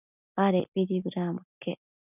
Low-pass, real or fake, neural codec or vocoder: 3.6 kHz; real; none